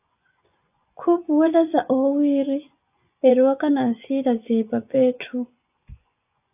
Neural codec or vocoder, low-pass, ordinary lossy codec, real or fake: vocoder, 24 kHz, 100 mel bands, Vocos; 3.6 kHz; AAC, 32 kbps; fake